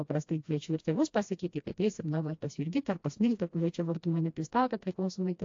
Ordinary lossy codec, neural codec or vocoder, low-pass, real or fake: AAC, 48 kbps; codec, 16 kHz, 1 kbps, FreqCodec, smaller model; 7.2 kHz; fake